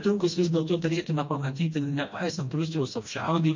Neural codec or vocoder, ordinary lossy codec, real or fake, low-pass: codec, 16 kHz, 1 kbps, FreqCodec, smaller model; MP3, 48 kbps; fake; 7.2 kHz